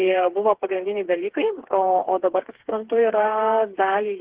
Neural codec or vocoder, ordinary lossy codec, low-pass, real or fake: codec, 16 kHz, 4 kbps, FreqCodec, smaller model; Opus, 16 kbps; 3.6 kHz; fake